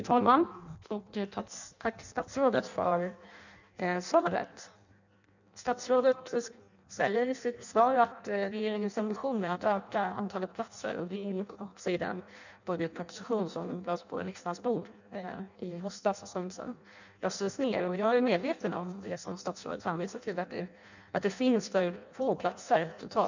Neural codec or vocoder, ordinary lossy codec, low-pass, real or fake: codec, 16 kHz in and 24 kHz out, 0.6 kbps, FireRedTTS-2 codec; none; 7.2 kHz; fake